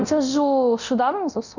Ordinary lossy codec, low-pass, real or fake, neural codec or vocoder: none; 7.2 kHz; fake; codec, 24 kHz, 0.9 kbps, DualCodec